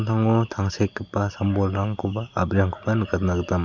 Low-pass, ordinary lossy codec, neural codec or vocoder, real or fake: 7.2 kHz; none; none; real